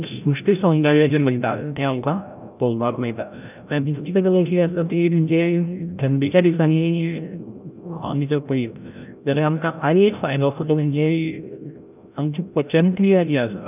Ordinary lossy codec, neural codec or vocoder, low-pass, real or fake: none; codec, 16 kHz, 0.5 kbps, FreqCodec, larger model; 3.6 kHz; fake